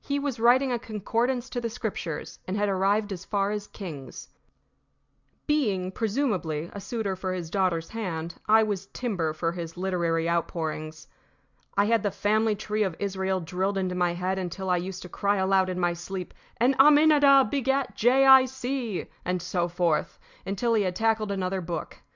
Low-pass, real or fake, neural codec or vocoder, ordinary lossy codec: 7.2 kHz; real; none; Opus, 64 kbps